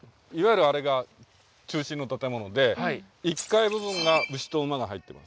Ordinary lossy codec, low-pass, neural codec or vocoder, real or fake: none; none; none; real